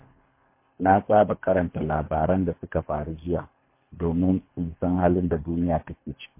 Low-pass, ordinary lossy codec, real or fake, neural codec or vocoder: 3.6 kHz; MP3, 24 kbps; fake; codec, 24 kHz, 3 kbps, HILCodec